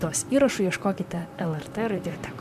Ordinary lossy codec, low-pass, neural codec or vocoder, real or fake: MP3, 96 kbps; 14.4 kHz; vocoder, 44.1 kHz, 128 mel bands, Pupu-Vocoder; fake